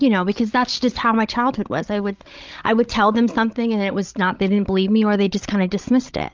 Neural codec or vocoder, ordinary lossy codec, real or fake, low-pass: codec, 16 kHz, 16 kbps, FreqCodec, larger model; Opus, 24 kbps; fake; 7.2 kHz